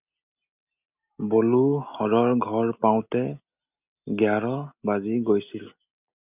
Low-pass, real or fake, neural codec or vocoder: 3.6 kHz; real; none